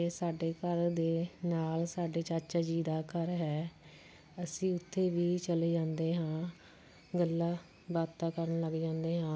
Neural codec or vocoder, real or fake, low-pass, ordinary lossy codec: none; real; none; none